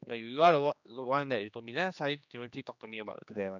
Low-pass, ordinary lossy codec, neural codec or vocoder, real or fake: 7.2 kHz; none; codec, 16 kHz, 2 kbps, X-Codec, HuBERT features, trained on general audio; fake